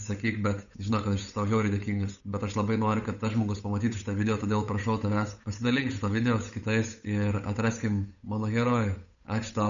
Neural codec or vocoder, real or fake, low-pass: codec, 16 kHz, 8 kbps, FunCodec, trained on Chinese and English, 25 frames a second; fake; 7.2 kHz